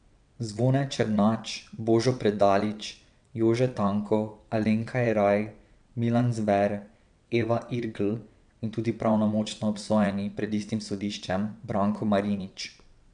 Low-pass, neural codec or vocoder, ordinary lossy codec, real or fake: 9.9 kHz; vocoder, 22.05 kHz, 80 mel bands, WaveNeXt; none; fake